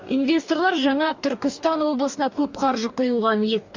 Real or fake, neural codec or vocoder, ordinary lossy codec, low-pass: fake; codec, 44.1 kHz, 2.6 kbps, DAC; MP3, 64 kbps; 7.2 kHz